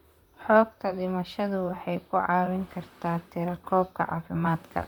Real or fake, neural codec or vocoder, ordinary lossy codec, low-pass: fake; vocoder, 44.1 kHz, 128 mel bands, Pupu-Vocoder; MP3, 96 kbps; 19.8 kHz